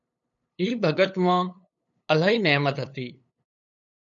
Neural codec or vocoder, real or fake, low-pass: codec, 16 kHz, 8 kbps, FunCodec, trained on LibriTTS, 25 frames a second; fake; 7.2 kHz